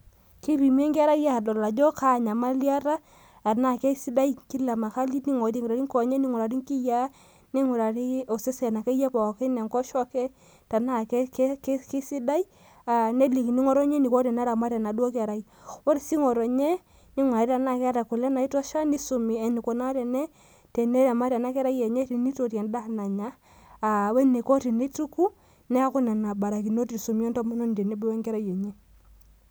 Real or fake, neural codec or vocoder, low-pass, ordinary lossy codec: real; none; none; none